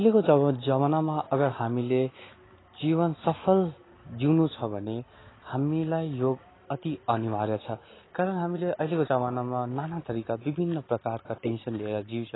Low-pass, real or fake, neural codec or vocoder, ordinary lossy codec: 7.2 kHz; real; none; AAC, 16 kbps